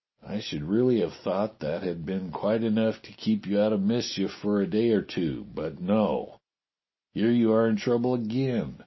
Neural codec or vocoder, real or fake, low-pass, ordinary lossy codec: none; real; 7.2 kHz; MP3, 24 kbps